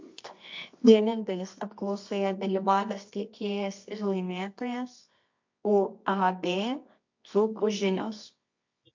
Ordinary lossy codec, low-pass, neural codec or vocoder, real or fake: MP3, 48 kbps; 7.2 kHz; codec, 24 kHz, 0.9 kbps, WavTokenizer, medium music audio release; fake